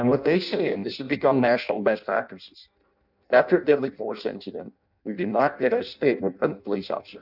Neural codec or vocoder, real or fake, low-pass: codec, 16 kHz in and 24 kHz out, 0.6 kbps, FireRedTTS-2 codec; fake; 5.4 kHz